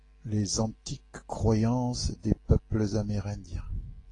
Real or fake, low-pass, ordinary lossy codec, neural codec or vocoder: real; 10.8 kHz; AAC, 32 kbps; none